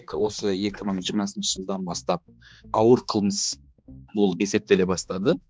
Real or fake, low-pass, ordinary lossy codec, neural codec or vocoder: fake; none; none; codec, 16 kHz, 2 kbps, X-Codec, HuBERT features, trained on balanced general audio